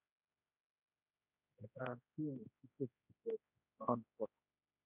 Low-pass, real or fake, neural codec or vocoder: 3.6 kHz; fake; codec, 16 kHz, 1 kbps, X-Codec, HuBERT features, trained on general audio